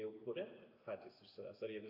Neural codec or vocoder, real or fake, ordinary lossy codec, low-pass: none; real; AAC, 48 kbps; 5.4 kHz